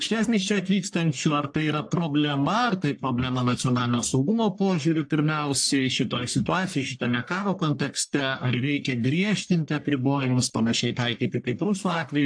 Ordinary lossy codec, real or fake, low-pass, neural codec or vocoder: AAC, 64 kbps; fake; 9.9 kHz; codec, 44.1 kHz, 1.7 kbps, Pupu-Codec